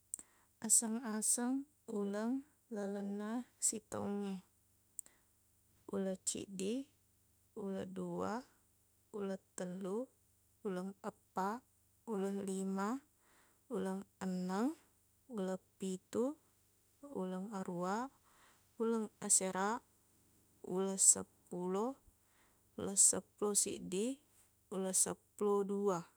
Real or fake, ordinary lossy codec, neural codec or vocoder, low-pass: fake; none; autoencoder, 48 kHz, 32 numbers a frame, DAC-VAE, trained on Japanese speech; none